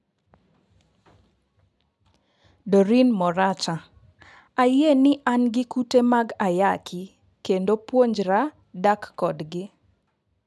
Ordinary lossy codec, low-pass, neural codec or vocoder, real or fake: none; none; none; real